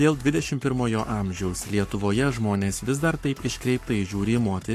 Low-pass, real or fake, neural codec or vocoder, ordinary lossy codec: 14.4 kHz; fake; codec, 44.1 kHz, 7.8 kbps, Pupu-Codec; AAC, 64 kbps